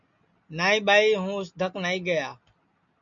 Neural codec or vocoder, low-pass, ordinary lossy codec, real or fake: none; 7.2 kHz; MP3, 96 kbps; real